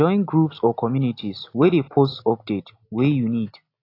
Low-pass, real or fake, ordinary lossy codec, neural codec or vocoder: 5.4 kHz; real; AAC, 32 kbps; none